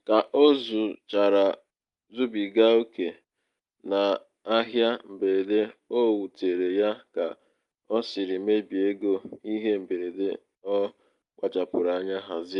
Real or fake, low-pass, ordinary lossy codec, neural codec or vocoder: real; 10.8 kHz; Opus, 32 kbps; none